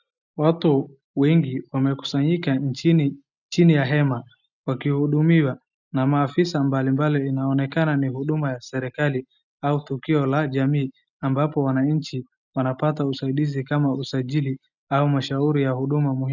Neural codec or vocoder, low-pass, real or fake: none; 7.2 kHz; real